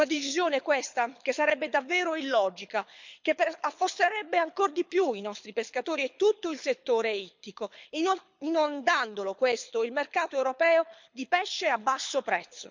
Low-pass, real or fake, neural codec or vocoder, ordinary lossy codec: 7.2 kHz; fake; codec, 24 kHz, 6 kbps, HILCodec; MP3, 64 kbps